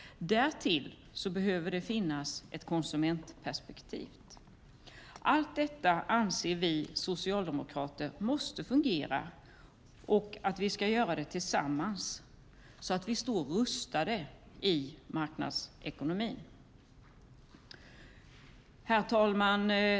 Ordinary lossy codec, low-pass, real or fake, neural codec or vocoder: none; none; real; none